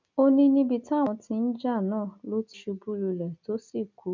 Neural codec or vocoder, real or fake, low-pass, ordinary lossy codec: none; real; 7.2 kHz; none